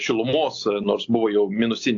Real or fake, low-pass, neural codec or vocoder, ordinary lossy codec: real; 7.2 kHz; none; AAC, 48 kbps